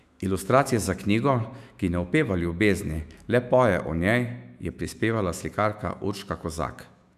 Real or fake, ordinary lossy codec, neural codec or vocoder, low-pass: fake; none; autoencoder, 48 kHz, 128 numbers a frame, DAC-VAE, trained on Japanese speech; 14.4 kHz